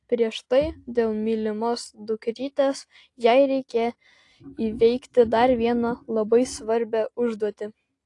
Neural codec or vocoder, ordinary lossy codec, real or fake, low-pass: none; AAC, 48 kbps; real; 10.8 kHz